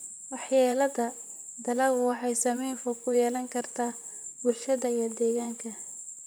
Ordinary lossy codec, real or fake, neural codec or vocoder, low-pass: none; fake; vocoder, 44.1 kHz, 128 mel bands, Pupu-Vocoder; none